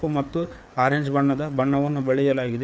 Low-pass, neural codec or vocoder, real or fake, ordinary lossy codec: none; codec, 16 kHz, 4 kbps, FreqCodec, larger model; fake; none